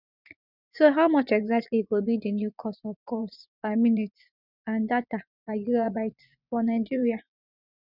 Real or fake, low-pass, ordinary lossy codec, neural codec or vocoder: fake; 5.4 kHz; none; vocoder, 24 kHz, 100 mel bands, Vocos